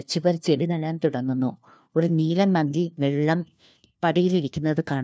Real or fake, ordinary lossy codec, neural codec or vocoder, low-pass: fake; none; codec, 16 kHz, 1 kbps, FunCodec, trained on LibriTTS, 50 frames a second; none